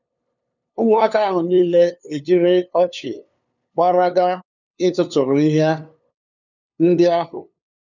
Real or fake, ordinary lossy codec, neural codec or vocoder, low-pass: fake; none; codec, 16 kHz, 2 kbps, FunCodec, trained on LibriTTS, 25 frames a second; 7.2 kHz